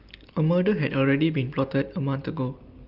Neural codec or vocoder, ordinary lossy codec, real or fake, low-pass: none; Opus, 24 kbps; real; 5.4 kHz